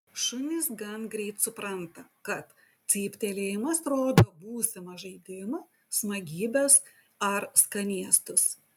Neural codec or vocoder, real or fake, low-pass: none; real; 19.8 kHz